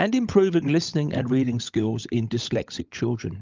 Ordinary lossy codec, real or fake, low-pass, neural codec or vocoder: Opus, 24 kbps; fake; 7.2 kHz; codec, 16 kHz, 16 kbps, FunCodec, trained on LibriTTS, 50 frames a second